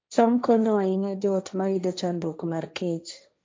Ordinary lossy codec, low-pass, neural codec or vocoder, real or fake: none; none; codec, 16 kHz, 1.1 kbps, Voila-Tokenizer; fake